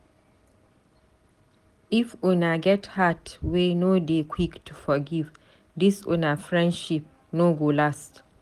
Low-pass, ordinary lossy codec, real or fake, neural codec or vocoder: 14.4 kHz; Opus, 24 kbps; real; none